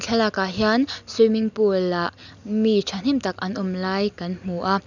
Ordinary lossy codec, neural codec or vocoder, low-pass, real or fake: none; none; 7.2 kHz; real